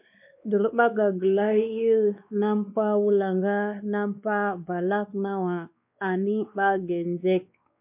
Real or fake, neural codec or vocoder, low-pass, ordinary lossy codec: fake; codec, 16 kHz, 2 kbps, X-Codec, WavLM features, trained on Multilingual LibriSpeech; 3.6 kHz; MP3, 32 kbps